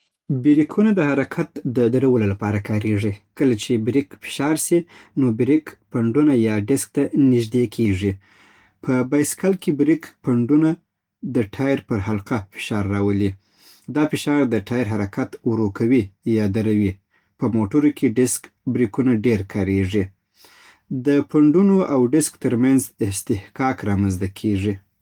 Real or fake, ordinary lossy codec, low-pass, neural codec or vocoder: real; Opus, 24 kbps; 19.8 kHz; none